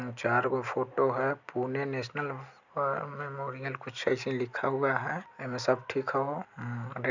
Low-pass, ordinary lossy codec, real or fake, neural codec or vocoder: 7.2 kHz; none; real; none